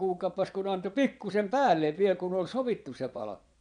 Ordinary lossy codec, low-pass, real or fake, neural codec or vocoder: none; 9.9 kHz; fake; vocoder, 22.05 kHz, 80 mel bands, Vocos